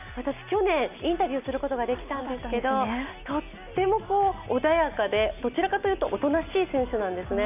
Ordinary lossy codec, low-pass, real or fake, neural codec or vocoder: none; 3.6 kHz; real; none